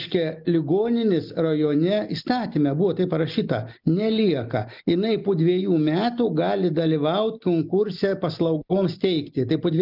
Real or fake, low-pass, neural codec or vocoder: real; 5.4 kHz; none